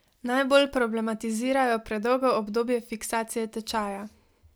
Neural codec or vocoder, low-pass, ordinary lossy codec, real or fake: none; none; none; real